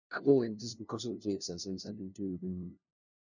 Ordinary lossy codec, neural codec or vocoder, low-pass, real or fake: none; codec, 16 kHz, 0.5 kbps, FunCodec, trained on LibriTTS, 25 frames a second; 7.2 kHz; fake